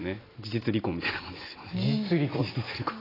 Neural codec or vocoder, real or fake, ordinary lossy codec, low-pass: none; real; AAC, 24 kbps; 5.4 kHz